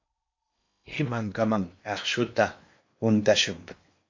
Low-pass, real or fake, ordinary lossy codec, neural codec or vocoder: 7.2 kHz; fake; MP3, 48 kbps; codec, 16 kHz in and 24 kHz out, 0.8 kbps, FocalCodec, streaming, 65536 codes